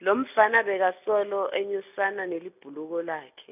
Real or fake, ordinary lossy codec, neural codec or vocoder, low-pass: real; none; none; 3.6 kHz